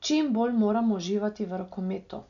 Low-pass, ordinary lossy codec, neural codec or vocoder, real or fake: 7.2 kHz; none; none; real